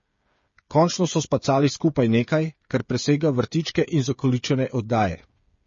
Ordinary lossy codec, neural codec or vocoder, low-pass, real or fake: MP3, 32 kbps; codec, 16 kHz, 8 kbps, FreqCodec, smaller model; 7.2 kHz; fake